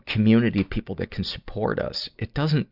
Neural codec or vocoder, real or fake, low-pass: none; real; 5.4 kHz